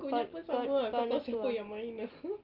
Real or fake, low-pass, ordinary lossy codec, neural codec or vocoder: real; 5.4 kHz; Opus, 32 kbps; none